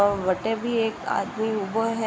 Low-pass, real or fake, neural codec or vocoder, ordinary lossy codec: none; real; none; none